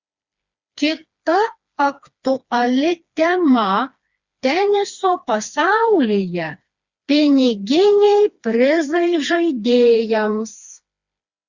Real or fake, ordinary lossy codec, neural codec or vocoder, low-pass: fake; Opus, 64 kbps; codec, 16 kHz, 2 kbps, FreqCodec, smaller model; 7.2 kHz